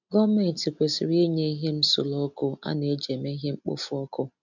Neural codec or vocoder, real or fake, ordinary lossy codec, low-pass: none; real; none; 7.2 kHz